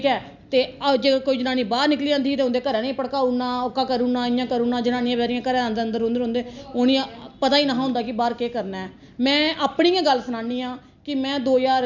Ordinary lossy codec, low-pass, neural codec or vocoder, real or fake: none; 7.2 kHz; none; real